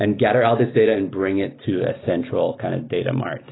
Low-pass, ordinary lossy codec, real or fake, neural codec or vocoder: 7.2 kHz; AAC, 16 kbps; real; none